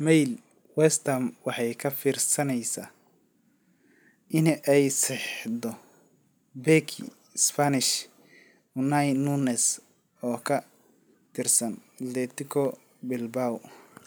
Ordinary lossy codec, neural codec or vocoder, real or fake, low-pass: none; none; real; none